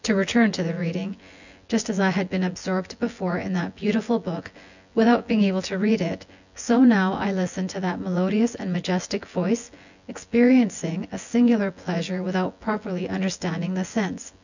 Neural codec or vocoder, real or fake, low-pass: vocoder, 24 kHz, 100 mel bands, Vocos; fake; 7.2 kHz